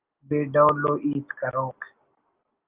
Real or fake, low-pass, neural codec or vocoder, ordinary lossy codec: real; 3.6 kHz; none; Opus, 24 kbps